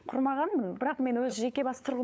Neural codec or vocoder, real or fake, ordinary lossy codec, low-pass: codec, 16 kHz, 8 kbps, FunCodec, trained on LibriTTS, 25 frames a second; fake; none; none